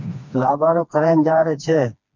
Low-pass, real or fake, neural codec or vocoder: 7.2 kHz; fake; codec, 16 kHz, 2 kbps, FreqCodec, smaller model